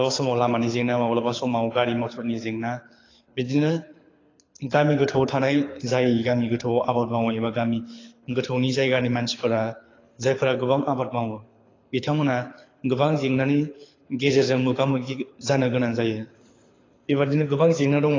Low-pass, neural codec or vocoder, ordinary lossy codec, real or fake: 7.2 kHz; codec, 24 kHz, 6 kbps, HILCodec; AAC, 32 kbps; fake